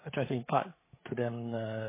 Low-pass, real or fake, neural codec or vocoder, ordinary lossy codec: 3.6 kHz; fake; codec, 16 kHz, 8 kbps, FreqCodec, larger model; MP3, 16 kbps